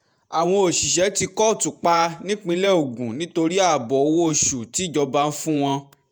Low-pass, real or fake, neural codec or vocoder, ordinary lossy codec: none; fake; vocoder, 48 kHz, 128 mel bands, Vocos; none